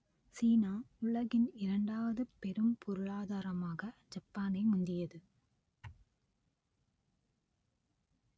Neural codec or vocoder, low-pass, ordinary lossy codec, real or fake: none; none; none; real